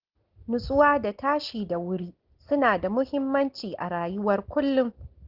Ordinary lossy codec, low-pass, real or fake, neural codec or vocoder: Opus, 16 kbps; 5.4 kHz; real; none